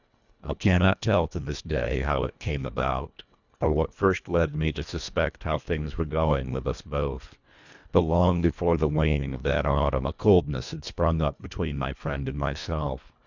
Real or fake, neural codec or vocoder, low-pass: fake; codec, 24 kHz, 1.5 kbps, HILCodec; 7.2 kHz